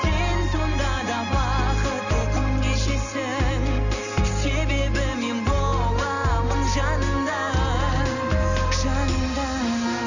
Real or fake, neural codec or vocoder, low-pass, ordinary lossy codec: real; none; 7.2 kHz; none